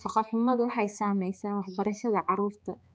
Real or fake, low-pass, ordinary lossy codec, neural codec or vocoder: fake; none; none; codec, 16 kHz, 2 kbps, X-Codec, HuBERT features, trained on balanced general audio